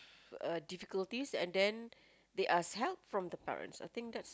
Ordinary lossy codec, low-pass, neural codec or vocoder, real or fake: none; none; none; real